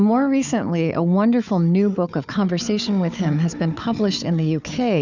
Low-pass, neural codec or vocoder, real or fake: 7.2 kHz; codec, 16 kHz, 4 kbps, FunCodec, trained on Chinese and English, 50 frames a second; fake